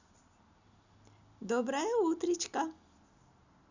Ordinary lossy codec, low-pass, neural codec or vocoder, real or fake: none; 7.2 kHz; none; real